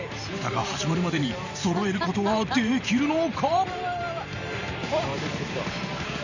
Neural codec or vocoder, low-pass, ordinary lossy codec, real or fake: vocoder, 44.1 kHz, 128 mel bands every 512 samples, BigVGAN v2; 7.2 kHz; none; fake